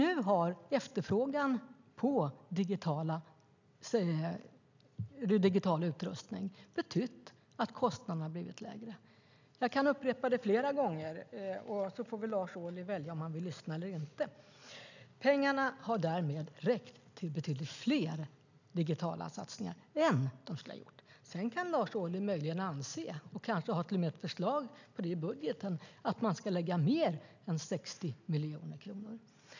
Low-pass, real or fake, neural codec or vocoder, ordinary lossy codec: 7.2 kHz; real; none; none